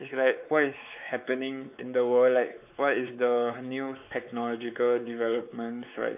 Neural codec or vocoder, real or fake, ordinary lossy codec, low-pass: codec, 16 kHz, 4 kbps, X-Codec, WavLM features, trained on Multilingual LibriSpeech; fake; none; 3.6 kHz